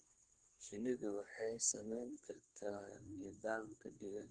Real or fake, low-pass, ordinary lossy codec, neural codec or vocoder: fake; 9.9 kHz; Opus, 16 kbps; codec, 24 kHz, 1 kbps, SNAC